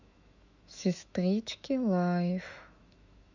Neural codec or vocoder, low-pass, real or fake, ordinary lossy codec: autoencoder, 48 kHz, 128 numbers a frame, DAC-VAE, trained on Japanese speech; 7.2 kHz; fake; none